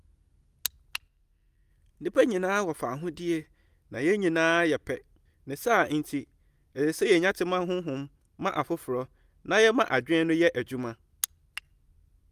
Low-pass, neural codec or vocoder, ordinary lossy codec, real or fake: 14.4 kHz; none; Opus, 32 kbps; real